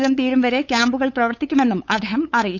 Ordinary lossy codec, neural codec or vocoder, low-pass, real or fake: none; codec, 16 kHz, 8 kbps, FunCodec, trained on LibriTTS, 25 frames a second; 7.2 kHz; fake